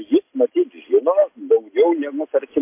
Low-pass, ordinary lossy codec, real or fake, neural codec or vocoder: 3.6 kHz; MP3, 24 kbps; real; none